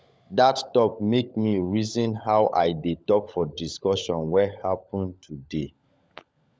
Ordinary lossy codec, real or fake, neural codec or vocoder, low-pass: none; fake; codec, 16 kHz, 16 kbps, FunCodec, trained on LibriTTS, 50 frames a second; none